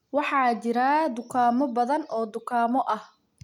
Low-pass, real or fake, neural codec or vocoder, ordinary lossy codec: 19.8 kHz; real; none; none